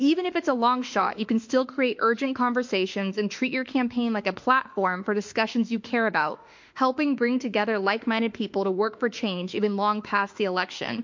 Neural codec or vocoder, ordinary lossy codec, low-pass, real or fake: autoencoder, 48 kHz, 32 numbers a frame, DAC-VAE, trained on Japanese speech; MP3, 48 kbps; 7.2 kHz; fake